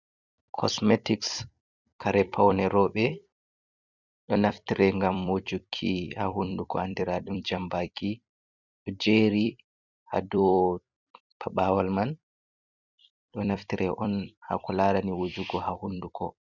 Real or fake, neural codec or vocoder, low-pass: fake; vocoder, 44.1 kHz, 128 mel bands every 256 samples, BigVGAN v2; 7.2 kHz